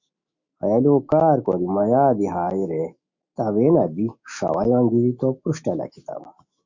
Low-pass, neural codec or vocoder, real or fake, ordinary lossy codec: 7.2 kHz; autoencoder, 48 kHz, 128 numbers a frame, DAC-VAE, trained on Japanese speech; fake; Opus, 64 kbps